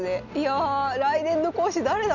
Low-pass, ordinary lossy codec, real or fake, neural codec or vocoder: 7.2 kHz; none; real; none